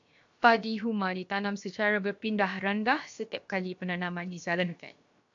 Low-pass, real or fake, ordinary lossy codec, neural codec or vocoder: 7.2 kHz; fake; AAC, 64 kbps; codec, 16 kHz, about 1 kbps, DyCAST, with the encoder's durations